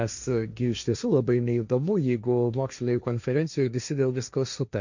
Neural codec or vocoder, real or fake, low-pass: codec, 16 kHz, 1.1 kbps, Voila-Tokenizer; fake; 7.2 kHz